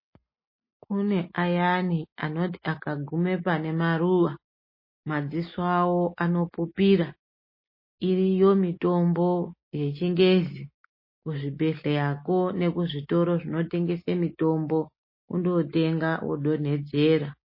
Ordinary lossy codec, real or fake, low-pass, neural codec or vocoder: MP3, 24 kbps; real; 5.4 kHz; none